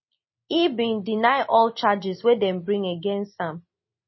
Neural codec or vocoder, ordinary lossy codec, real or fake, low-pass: none; MP3, 24 kbps; real; 7.2 kHz